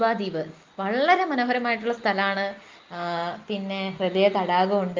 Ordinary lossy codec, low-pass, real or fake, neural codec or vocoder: Opus, 24 kbps; 7.2 kHz; real; none